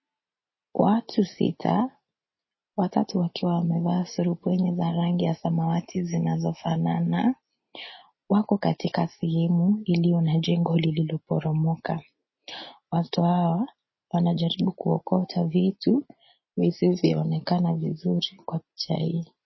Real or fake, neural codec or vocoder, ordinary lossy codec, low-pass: real; none; MP3, 24 kbps; 7.2 kHz